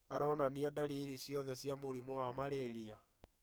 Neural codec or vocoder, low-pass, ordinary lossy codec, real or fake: codec, 44.1 kHz, 2.6 kbps, SNAC; none; none; fake